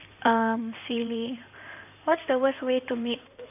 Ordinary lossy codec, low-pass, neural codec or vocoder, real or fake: none; 3.6 kHz; codec, 16 kHz in and 24 kHz out, 2.2 kbps, FireRedTTS-2 codec; fake